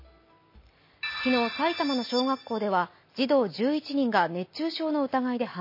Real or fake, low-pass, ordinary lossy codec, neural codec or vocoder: real; 5.4 kHz; MP3, 24 kbps; none